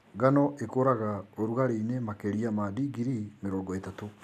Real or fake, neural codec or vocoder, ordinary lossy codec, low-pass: real; none; none; 14.4 kHz